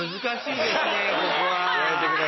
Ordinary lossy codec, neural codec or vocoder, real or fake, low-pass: MP3, 24 kbps; none; real; 7.2 kHz